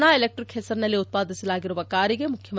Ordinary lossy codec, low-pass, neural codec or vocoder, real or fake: none; none; none; real